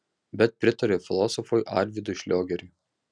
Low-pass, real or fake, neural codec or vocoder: 9.9 kHz; real; none